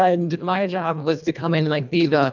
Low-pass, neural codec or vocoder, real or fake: 7.2 kHz; codec, 24 kHz, 1.5 kbps, HILCodec; fake